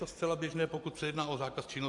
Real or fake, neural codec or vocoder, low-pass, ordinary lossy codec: fake; vocoder, 44.1 kHz, 128 mel bands every 256 samples, BigVGAN v2; 10.8 kHz; AAC, 64 kbps